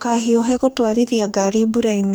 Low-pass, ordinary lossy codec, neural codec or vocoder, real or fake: none; none; codec, 44.1 kHz, 2.6 kbps, DAC; fake